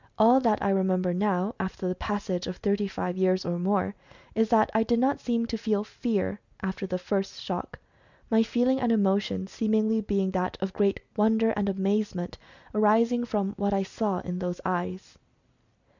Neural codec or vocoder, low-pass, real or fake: none; 7.2 kHz; real